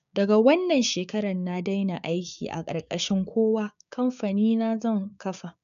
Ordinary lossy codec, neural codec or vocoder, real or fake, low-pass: none; codec, 16 kHz, 6 kbps, DAC; fake; 7.2 kHz